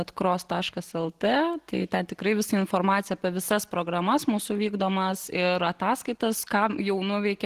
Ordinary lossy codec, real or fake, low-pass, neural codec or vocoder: Opus, 16 kbps; real; 14.4 kHz; none